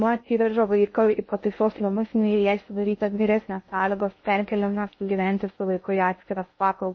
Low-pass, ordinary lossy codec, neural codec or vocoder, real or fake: 7.2 kHz; MP3, 32 kbps; codec, 16 kHz in and 24 kHz out, 0.6 kbps, FocalCodec, streaming, 2048 codes; fake